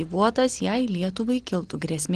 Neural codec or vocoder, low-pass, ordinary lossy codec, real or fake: none; 9.9 kHz; Opus, 16 kbps; real